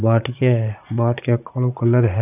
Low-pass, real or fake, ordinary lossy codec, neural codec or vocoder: 3.6 kHz; fake; none; vocoder, 44.1 kHz, 80 mel bands, Vocos